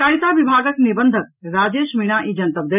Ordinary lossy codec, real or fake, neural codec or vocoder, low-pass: none; real; none; 3.6 kHz